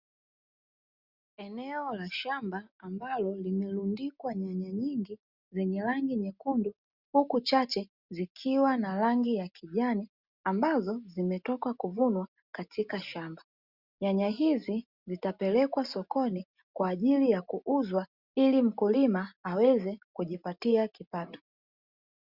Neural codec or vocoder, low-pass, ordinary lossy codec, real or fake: none; 5.4 kHz; Opus, 64 kbps; real